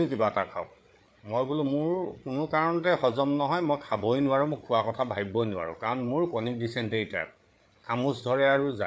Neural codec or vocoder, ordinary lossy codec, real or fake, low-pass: codec, 16 kHz, 8 kbps, FreqCodec, larger model; none; fake; none